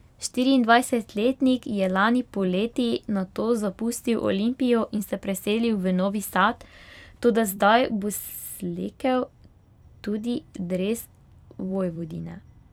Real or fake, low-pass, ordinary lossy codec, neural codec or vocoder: fake; 19.8 kHz; none; vocoder, 44.1 kHz, 128 mel bands every 256 samples, BigVGAN v2